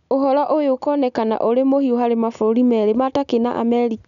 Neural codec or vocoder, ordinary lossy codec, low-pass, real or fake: none; none; 7.2 kHz; real